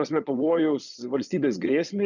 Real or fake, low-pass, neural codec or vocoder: fake; 7.2 kHz; vocoder, 22.05 kHz, 80 mel bands, Vocos